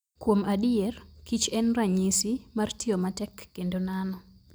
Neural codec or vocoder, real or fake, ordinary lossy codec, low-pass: none; real; none; none